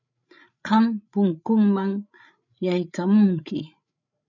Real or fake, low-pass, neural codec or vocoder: fake; 7.2 kHz; codec, 16 kHz, 8 kbps, FreqCodec, larger model